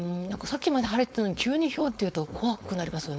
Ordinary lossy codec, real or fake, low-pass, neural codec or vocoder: none; fake; none; codec, 16 kHz, 4.8 kbps, FACodec